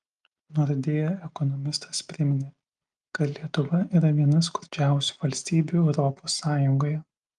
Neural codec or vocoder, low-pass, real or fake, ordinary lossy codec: none; 10.8 kHz; real; Opus, 32 kbps